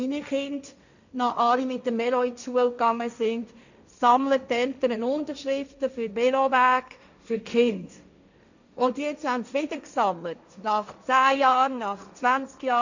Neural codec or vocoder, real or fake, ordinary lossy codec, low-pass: codec, 16 kHz, 1.1 kbps, Voila-Tokenizer; fake; none; 7.2 kHz